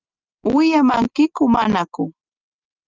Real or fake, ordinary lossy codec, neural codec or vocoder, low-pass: real; Opus, 32 kbps; none; 7.2 kHz